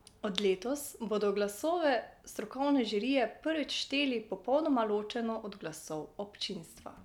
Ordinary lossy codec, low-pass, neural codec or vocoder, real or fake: none; 19.8 kHz; none; real